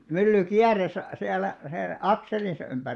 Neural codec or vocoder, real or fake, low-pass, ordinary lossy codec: vocoder, 24 kHz, 100 mel bands, Vocos; fake; none; none